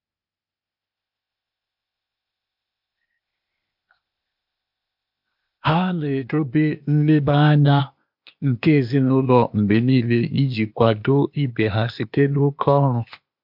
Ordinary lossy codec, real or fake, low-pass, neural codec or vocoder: MP3, 48 kbps; fake; 5.4 kHz; codec, 16 kHz, 0.8 kbps, ZipCodec